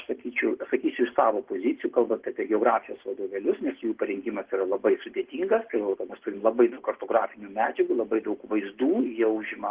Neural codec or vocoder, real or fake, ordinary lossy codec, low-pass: none; real; Opus, 16 kbps; 3.6 kHz